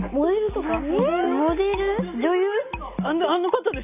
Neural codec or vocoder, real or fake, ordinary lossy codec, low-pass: none; real; none; 3.6 kHz